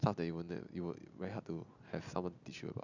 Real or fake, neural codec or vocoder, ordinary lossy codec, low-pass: real; none; none; 7.2 kHz